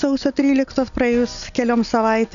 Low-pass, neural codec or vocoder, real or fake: 7.2 kHz; none; real